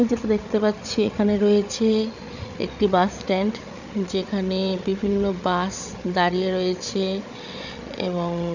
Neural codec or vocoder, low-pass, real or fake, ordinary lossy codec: codec, 16 kHz, 8 kbps, FreqCodec, larger model; 7.2 kHz; fake; none